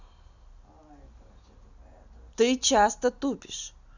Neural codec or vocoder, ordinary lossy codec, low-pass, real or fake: none; none; 7.2 kHz; real